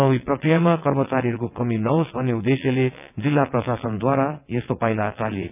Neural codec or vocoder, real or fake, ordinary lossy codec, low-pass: vocoder, 22.05 kHz, 80 mel bands, WaveNeXt; fake; none; 3.6 kHz